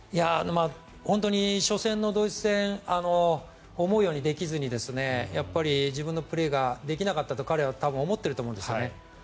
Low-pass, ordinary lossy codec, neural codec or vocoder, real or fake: none; none; none; real